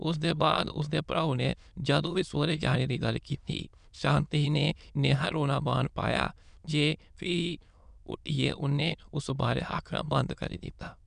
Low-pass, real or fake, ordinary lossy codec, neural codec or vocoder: 9.9 kHz; fake; none; autoencoder, 22.05 kHz, a latent of 192 numbers a frame, VITS, trained on many speakers